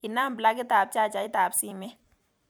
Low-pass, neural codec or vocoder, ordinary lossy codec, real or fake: none; vocoder, 44.1 kHz, 128 mel bands every 256 samples, BigVGAN v2; none; fake